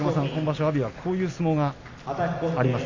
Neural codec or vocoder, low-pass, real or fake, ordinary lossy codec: none; 7.2 kHz; real; MP3, 48 kbps